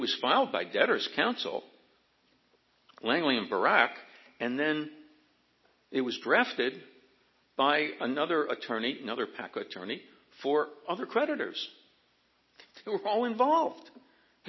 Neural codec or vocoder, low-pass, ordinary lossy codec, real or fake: none; 7.2 kHz; MP3, 24 kbps; real